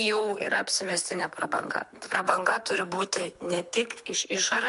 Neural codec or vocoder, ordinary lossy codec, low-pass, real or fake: codec, 24 kHz, 3 kbps, HILCodec; MP3, 64 kbps; 10.8 kHz; fake